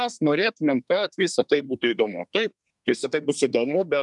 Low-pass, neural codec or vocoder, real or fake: 10.8 kHz; codec, 24 kHz, 1 kbps, SNAC; fake